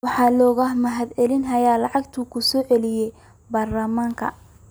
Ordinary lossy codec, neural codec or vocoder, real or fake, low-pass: none; none; real; none